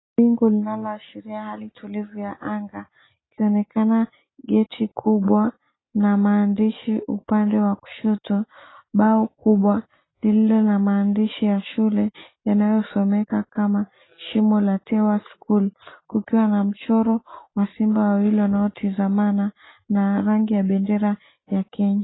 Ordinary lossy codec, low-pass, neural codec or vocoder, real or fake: AAC, 16 kbps; 7.2 kHz; none; real